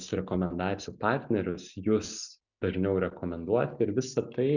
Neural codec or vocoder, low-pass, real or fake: none; 7.2 kHz; real